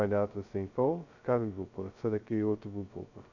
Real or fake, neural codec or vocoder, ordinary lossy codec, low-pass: fake; codec, 16 kHz, 0.2 kbps, FocalCodec; none; 7.2 kHz